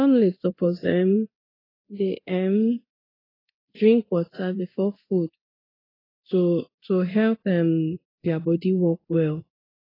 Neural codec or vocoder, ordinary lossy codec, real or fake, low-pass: codec, 24 kHz, 0.9 kbps, DualCodec; AAC, 24 kbps; fake; 5.4 kHz